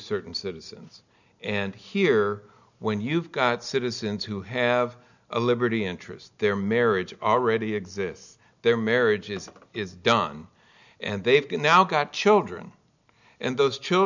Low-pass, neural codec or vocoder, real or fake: 7.2 kHz; none; real